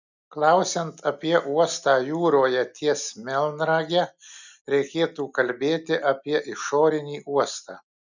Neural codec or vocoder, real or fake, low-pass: none; real; 7.2 kHz